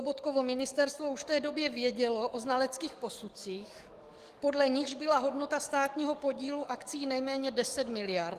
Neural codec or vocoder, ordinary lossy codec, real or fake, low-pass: codec, 44.1 kHz, 7.8 kbps, DAC; Opus, 24 kbps; fake; 14.4 kHz